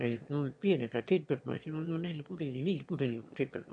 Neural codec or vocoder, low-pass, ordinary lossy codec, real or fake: autoencoder, 22.05 kHz, a latent of 192 numbers a frame, VITS, trained on one speaker; 9.9 kHz; MP3, 48 kbps; fake